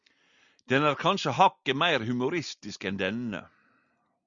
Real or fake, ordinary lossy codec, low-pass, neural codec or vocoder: real; Opus, 64 kbps; 7.2 kHz; none